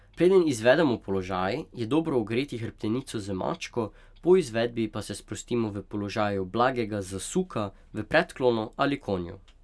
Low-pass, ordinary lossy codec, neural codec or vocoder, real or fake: none; none; none; real